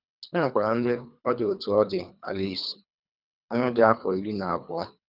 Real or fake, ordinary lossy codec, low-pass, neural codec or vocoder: fake; none; 5.4 kHz; codec, 24 kHz, 3 kbps, HILCodec